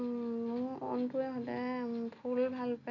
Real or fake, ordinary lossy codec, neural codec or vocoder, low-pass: real; none; none; 7.2 kHz